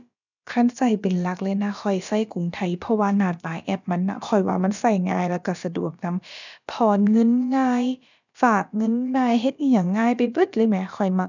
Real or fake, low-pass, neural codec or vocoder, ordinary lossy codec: fake; 7.2 kHz; codec, 16 kHz, about 1 kbps, DyCAST, with the encoder's durations; none